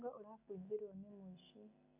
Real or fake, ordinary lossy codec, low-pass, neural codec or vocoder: real; none; 3.6 kHz; none